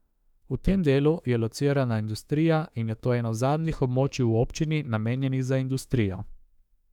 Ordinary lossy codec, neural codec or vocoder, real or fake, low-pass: none; autoencoder, 48 kHz, 32 numbers a frame, DAC-VAE, trained on Japanese speech; fake; 19.8 kHz